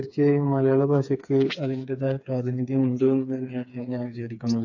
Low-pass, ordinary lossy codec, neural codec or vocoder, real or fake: 7.2 kHz; AAC, 48 kbps; codec, 16 kHz, 4 kbps, FreqCodec, smaller model; fake